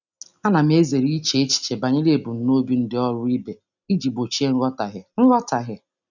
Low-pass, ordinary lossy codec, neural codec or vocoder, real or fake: 7.2 kHz; none; none; real